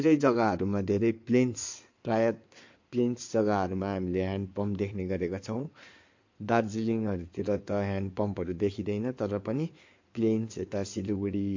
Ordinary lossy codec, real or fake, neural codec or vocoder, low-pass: MP3, 48 kbps; fake; codec, 16 kHz in and 24 kHz out, 2.2 kbps, FireRedTTS-2 codec; 7.2 kHz